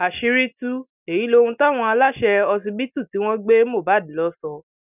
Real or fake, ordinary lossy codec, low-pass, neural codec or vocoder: real; none; 3.6 kHz; none